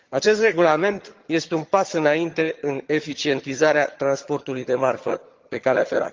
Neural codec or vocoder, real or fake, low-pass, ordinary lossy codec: vocoder, 22.05 kHz, 80 mel bands, HiFi-GAN; fake; 7.2 kHz; Opus, 32 kbps